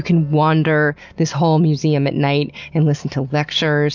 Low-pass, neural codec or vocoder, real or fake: 7.2 kHz; none; real